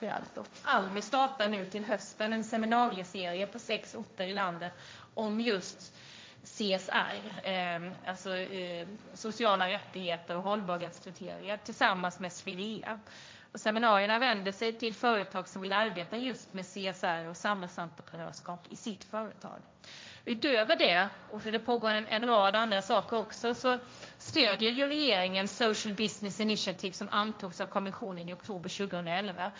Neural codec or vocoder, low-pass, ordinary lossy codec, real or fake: codec, 16 kHz, 1.1 kbps, Voila-Tokenizer; none; none; fake